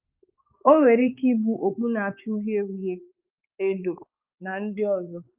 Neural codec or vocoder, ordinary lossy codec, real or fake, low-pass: codec, 16 kHz, 4 kbps, X-Codec, HuBERT features, trained on balanced general audio; Opus, 24 kbps; fake; 3.6 kHz